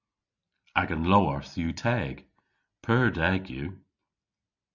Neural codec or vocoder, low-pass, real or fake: none; 7.2 kHz; real